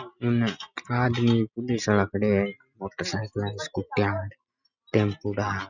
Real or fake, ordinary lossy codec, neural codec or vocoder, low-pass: real; none; none; 7.2 kHz